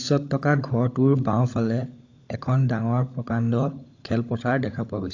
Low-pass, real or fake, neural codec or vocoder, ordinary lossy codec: 7.2 kHz; fake; codec, 16 kHz, 16 kbps, FunCodec, trained on LibriTTS, 50 frames a second; AAC, 48 kbps